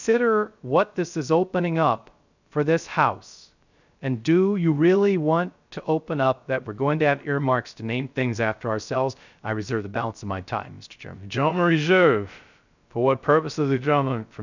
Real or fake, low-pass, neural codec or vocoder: fake; 7.2 kHz; codec, 16 kHz, 0.3 kbps, FocalCodec